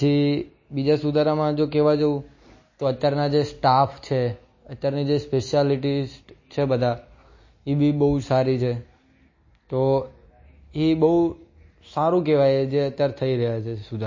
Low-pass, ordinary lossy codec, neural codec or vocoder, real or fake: 7.2 kHz; MP3, 32 kbps; none; real